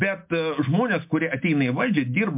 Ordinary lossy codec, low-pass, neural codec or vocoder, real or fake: MP3, 32 kbps; 3.6 kHz; none; real